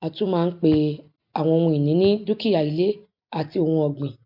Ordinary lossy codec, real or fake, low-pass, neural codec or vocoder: MP3, 48 kbps; real; 5.4 kHz; none